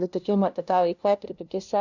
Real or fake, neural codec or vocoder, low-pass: fake; codec, 16 kHz, 0.5 kbps, FunCodec, trained on LibriTTS, 25 frames a second; 7.2 kHz